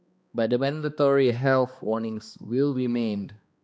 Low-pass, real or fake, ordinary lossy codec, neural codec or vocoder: none; fake; none; codec, 16 kHz, 2 kbps, X-Codec, HuBERT features, trained on balanced general audio